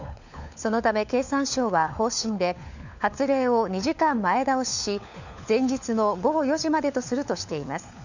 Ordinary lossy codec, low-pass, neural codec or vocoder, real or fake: none; 7.2 kHz; codec, 16 kHz, 4 kbps, FunCodec, trained on LibriTTS, 50 frames a second; fake